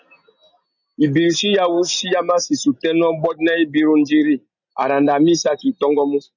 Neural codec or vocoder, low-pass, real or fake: none; 7.2 kHz; real